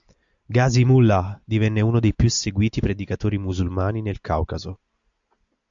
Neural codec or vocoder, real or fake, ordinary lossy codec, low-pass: none; real; AAC, 64 kbps; 7.2 kHz